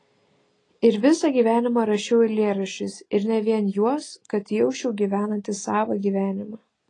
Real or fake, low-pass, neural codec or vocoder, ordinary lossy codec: real; 9.9 kHz; none; AAC, 32 kbps